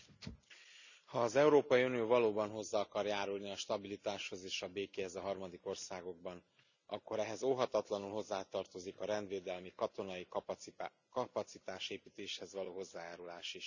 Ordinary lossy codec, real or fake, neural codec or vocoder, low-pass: none; real; none; 7.2 kHz